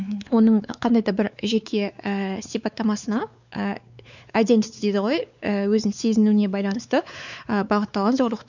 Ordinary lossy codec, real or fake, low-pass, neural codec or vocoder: none; fake; 7.2 kHz; codec, 16 kHz, 4 kbps, X-Codec, WavLM features, trained on Multilingual LibriSpeech